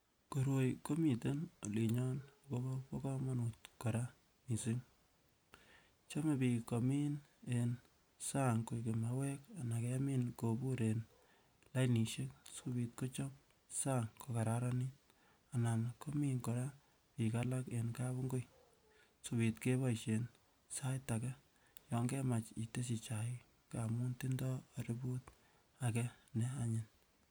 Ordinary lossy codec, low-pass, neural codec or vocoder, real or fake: none; none; none; real